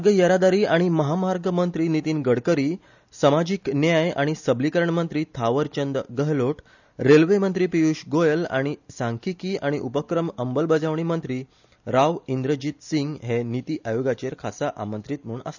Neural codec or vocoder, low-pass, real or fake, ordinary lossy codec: none; 7.2 kHz; real; none